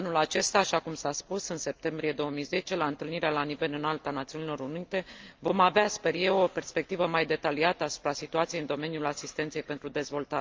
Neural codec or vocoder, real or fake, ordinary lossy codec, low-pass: none; real; Opus, 24 kbps; 7.2 kHz